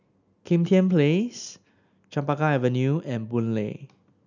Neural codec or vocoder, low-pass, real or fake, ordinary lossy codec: none; 7.2 kHz; real; none